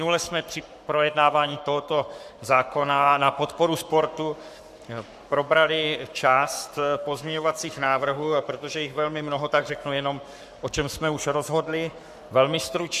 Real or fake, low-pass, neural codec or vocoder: fake; 14.4 kHz; codec, 44.1 kHz, 7.8 kbps, Pupu-Codec